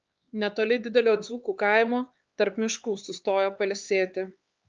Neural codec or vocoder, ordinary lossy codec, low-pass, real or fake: codec, 16 kHz, 4 kbps, X-Codec, HuBERT features, trained on LibriSpeech; Opus, 24 kbps; 7.2 kHz; fake